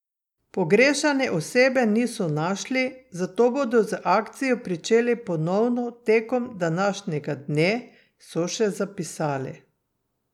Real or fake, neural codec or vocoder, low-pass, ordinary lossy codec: real; none; 19.8 kHz; none